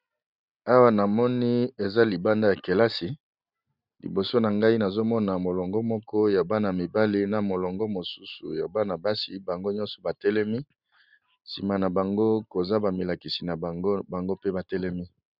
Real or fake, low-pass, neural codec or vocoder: real; 5.4 kHz; none